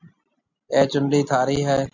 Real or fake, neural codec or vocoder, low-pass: real; none; 7.2 kHz